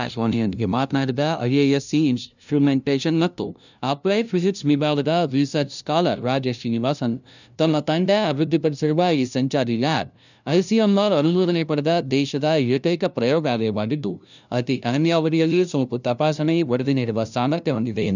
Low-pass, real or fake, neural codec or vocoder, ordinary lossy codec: 7.2 kHz; fake; codec, 16 kHz, 0.5 kbps, FunCodec, trained on LibriTTS, 25 frames a second; none